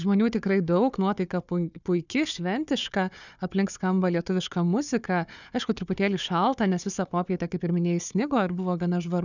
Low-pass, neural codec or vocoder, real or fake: 7.2 kHz; codec, 16 kHz, 4 kbps, FunCodec, trained on Chinese and English, 50 frames a second; fake